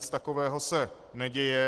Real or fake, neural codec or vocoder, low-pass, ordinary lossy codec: real; none; 10.8 kHz; Opus, 16 kbps